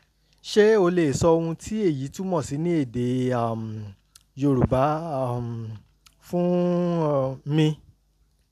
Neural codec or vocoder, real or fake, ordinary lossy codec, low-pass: none; real; none; 14.4 kHz